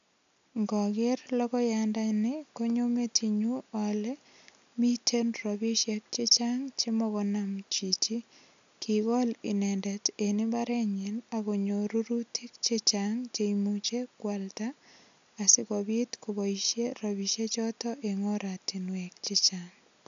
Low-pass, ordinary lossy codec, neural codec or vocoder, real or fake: 7.2 kHz; AAC, 96 kbps; none; real